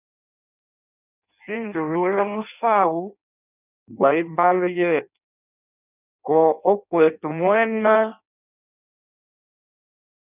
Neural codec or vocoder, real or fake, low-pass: codec, 16 kHz in and 24 kHz out, 0.6 kbps, FireRedTTS-2 codec; fake; 3.6 kHz